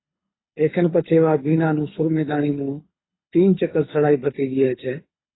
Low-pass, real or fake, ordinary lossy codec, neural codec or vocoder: 7.2 kHz; fake; AAC, 16 kbps; codec, 24 kHz, 6 kbps, HILCodec